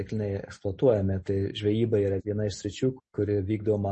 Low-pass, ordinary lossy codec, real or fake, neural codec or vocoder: 10.8 kHz; MP3, 32 kbps; real; none